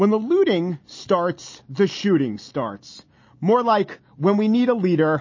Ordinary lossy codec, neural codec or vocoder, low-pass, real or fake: MP3, 32 kbps; none; 7.2 kHz; real